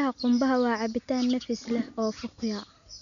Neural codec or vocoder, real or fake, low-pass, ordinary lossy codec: none; real; 7.2 kHz; none